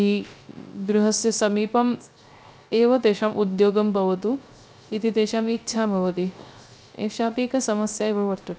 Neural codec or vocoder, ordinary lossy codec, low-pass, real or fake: codec, 16 kHz, 0.3 kbps, FocalCodec; none; none; fake